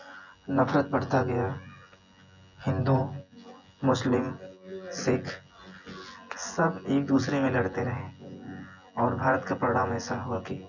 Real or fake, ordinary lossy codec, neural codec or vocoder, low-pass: fake; none; vocoder, 24 kHz, 100 mel bands, Vocos; 7.2 kHz